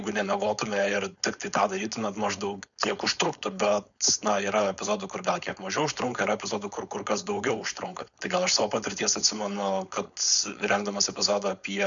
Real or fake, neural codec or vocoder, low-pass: fake; codec, 16 kHz, 4.8 kbps, FACodec; 7.2 kHz